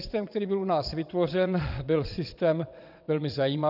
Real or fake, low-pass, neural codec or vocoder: fake; 5.4 kHz; vocoder, 22.05 kHz, 80 mel bands, WaveNeXt